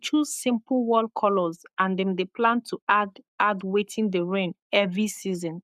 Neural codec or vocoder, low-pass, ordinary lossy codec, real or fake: codec, 44.1 kHz, 7.8 kbps, Pupu-Codec; 14.4 kHz; none; fake